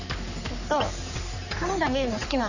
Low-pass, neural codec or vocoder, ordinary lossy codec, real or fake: 7.2 kHz; codec, 44.1 kHz, 3.4 kbps, Pupu-Codec; none; fake